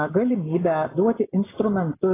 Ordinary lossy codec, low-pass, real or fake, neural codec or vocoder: AAC, 16 kbps; 3.6 kHz; real; none